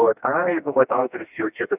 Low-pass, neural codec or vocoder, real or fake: 3.6 kHz; codec, 16 kHz, 1 kbps, FreqCodec, smaller model; fake